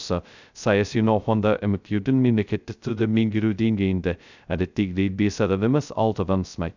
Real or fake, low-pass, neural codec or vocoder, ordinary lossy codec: fake; 7.2 kHz; codec, 16 kHz, 0.2 kbps, FocalCodec; none